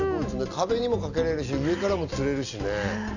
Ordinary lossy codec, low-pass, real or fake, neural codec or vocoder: none; 7.2 kHz; real; none